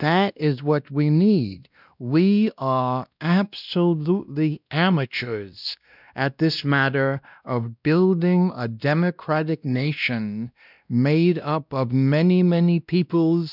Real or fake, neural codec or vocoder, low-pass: fake; codec, 16 kHz, 1 kbps, X-Codec, WavLM features, trained on Multilingual LibriSpeech; 5.4 kHz